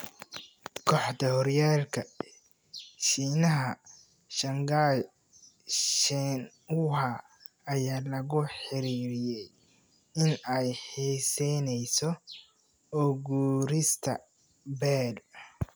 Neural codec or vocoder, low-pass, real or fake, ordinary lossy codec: none; none; real; none